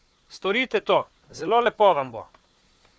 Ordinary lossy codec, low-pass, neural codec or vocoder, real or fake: none; none; codec, 16 kHz, 4 kbps, FunCodec, trained on Chinese and English, 50 frames a second; fake